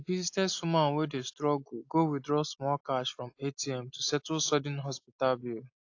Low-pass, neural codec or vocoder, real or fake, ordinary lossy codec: 7.2 kHz; none; real; AAC, 48 kbps